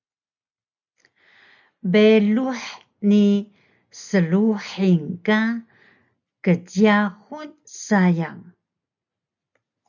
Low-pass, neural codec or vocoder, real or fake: 7.2 kHz; none; real